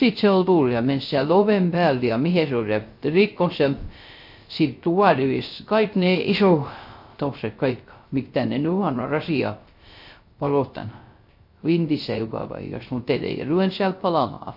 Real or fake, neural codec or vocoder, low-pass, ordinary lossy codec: fake; codec, 16 kHz, 0.3 kbps, FocalCodec; 5.4 kHz; MP3, 32 kbps